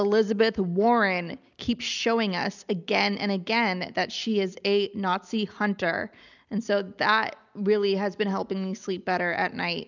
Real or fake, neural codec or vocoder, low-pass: real; none; 7.2 kHz